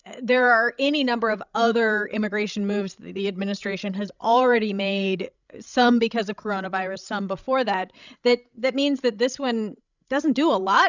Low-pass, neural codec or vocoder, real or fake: 7.2 kHz; codec, 16 kHz, 16 kbps, FreqCodec, larger model; fake